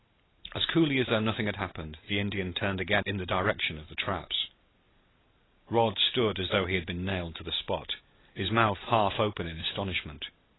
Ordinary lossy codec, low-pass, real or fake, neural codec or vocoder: AAC, 16 kbps; 7.2 kHz; real; none